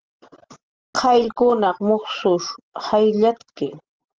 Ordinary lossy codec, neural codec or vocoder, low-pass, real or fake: Opus, 16 kbps; none; 7.2 kHz; real